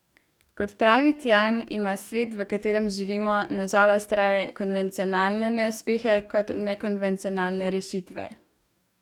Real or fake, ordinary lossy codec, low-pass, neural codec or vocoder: fake; none; 19.8 kHz; codec, 44.1 kHz, 2.6 kbps, DAC